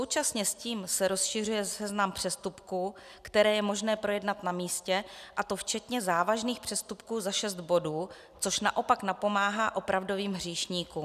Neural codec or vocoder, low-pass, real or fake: none; 14.4 kHz; real